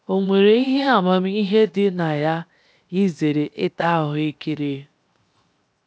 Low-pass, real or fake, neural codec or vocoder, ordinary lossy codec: none; fake; codec, 16 kHz, 0.7 kbps, FocalCodec; none